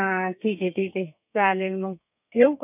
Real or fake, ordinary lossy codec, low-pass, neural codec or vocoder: fake; none; 3.6 kHz; codec, 32 kHz, 1.9 kbps, SNAC